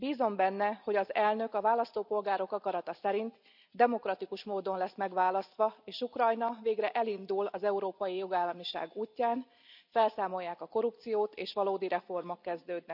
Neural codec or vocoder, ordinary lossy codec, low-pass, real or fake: none; none; 5.4 kHz; real